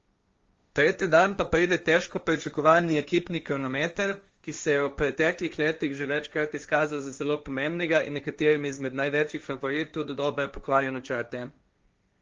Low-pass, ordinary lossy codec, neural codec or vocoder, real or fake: 7.2 kHz; Opus, 32 kbps; codec, 16 kHz, 1.1 kbps, Voila-Tokenizer; fake